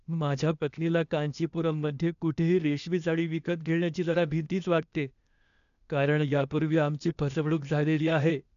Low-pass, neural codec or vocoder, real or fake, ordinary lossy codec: 7.2 kHz; codec, 16 kHz, 0.8 kbps, ZipCodec; fake; none